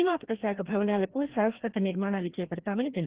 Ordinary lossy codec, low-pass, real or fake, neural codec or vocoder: Opus, 16 kbps; 3.6 kHz; fake; codec, 16 kHz, 1 kbps, FreqCodec, larger model